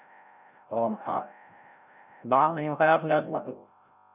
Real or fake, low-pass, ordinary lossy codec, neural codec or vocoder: fake; 3.6 kHz; none; codec, 16 kHz, 0.5 kbps, FreqCodec, larger model